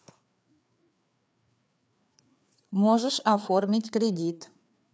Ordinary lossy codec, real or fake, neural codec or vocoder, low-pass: none; fake; codec, 16 kHz, 4 kbps, FreqCodec, larger model; none